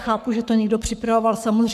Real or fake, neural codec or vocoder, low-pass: fake; codec, 44.1 kHz, 7.8 kbps, Pupu-Codec; 14.4 kHz